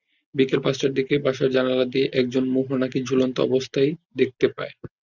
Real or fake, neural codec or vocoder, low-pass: real; none; 7.2 kHz